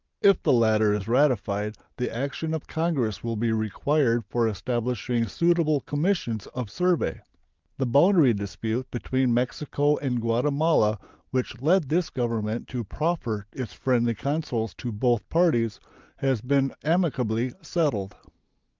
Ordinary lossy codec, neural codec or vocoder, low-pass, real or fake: Opus, 24 kbps; none; 7.2 kHz; real